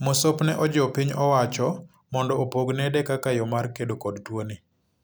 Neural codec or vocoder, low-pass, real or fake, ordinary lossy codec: none; none; real; none